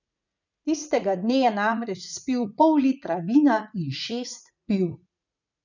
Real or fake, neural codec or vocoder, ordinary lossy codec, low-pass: fake; vocoder, 44.1 kHz, 80 mel bands, Vocos; none; 7.2 kHz